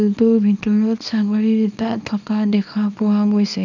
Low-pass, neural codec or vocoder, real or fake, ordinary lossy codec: 7.2 kHz; codec, 24 kHz, 0.9 kbps, WavTokenizer, small release; fake; none